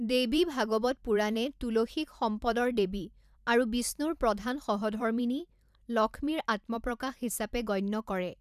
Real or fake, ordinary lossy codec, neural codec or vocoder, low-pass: fake; none; vocoder, 44.1 kHz, 128 mel bands every 512 samples, BigVGAN v2; 14.4 kHz